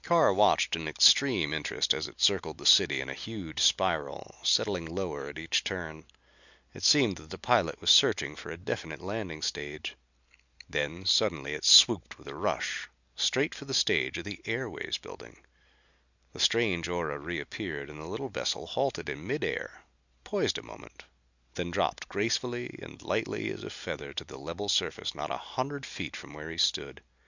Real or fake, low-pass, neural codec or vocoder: real; 7.2 kHz; none